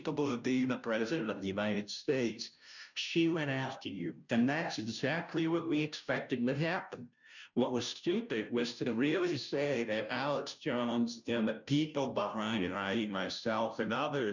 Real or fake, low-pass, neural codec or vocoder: fake; 7.2 kHz; codec, 16 kHz, 0.5 kbps, FunCodec, trained on Chinese and English, 25 frames a second